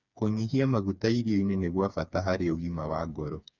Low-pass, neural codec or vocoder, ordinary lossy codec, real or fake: 7.2 kHz; codec, 16 kHz, 4 kbps, FreqCodec, smaller model; none; fake